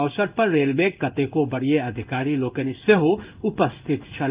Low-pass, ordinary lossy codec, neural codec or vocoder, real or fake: 3.6 kHz; Opus, 32 kbps; codec, 16 kHz in and 24 kHz out, 1 kbps, XY-Tokenizer; fake